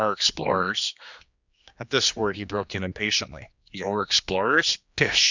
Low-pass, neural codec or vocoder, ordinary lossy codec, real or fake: 7.2 kHz; codec, 16 kHz, 2 kbps, X-Codec, HuBERT features, trained on general audio; Opus, 64 kbps; fake